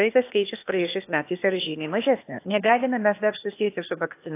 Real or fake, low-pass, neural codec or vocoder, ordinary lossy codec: fake; 3.6 kHz; codec, 16 kHz, 0.8 kbps, ZipCodec; AAC, 24 kbps